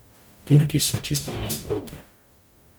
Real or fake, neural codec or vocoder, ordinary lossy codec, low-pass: fake; codec, 44.1 kHz, 0.9 kbps, DAC; none; none